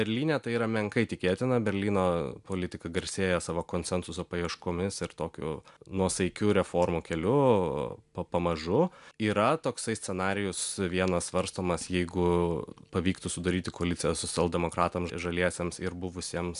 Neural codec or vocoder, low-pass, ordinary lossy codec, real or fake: none; 10.8 kHz; MP3, 96 kbps; real